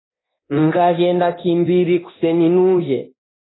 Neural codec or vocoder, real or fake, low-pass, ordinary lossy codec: codec, 24 kHz, 0.9 kbps, DualCodec; fake; 7.2 kHz; AAC, 16 kbps